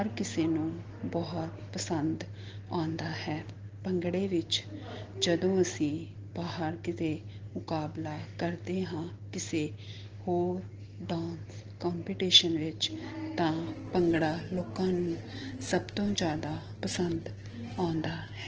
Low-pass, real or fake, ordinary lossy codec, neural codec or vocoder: 7.2 kHz; real; Opus, 16 kbps; none